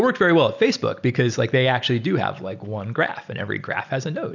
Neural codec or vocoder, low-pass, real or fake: none; 7.2 kHz; real